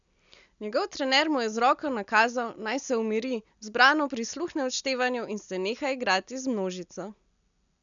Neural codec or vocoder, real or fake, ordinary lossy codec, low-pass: none; real; none; 7.2 kHz